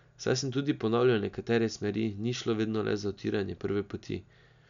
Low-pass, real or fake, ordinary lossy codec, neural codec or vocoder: 7.2 kHz; real; none; none